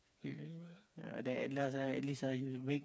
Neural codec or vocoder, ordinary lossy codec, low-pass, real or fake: codec, 16 kHz, 4 kbps, FreqCodec, smaller model; none; none; fake